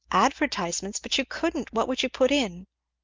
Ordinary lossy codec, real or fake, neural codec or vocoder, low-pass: Opus, 24 kbps; real; none; 7.2 kHz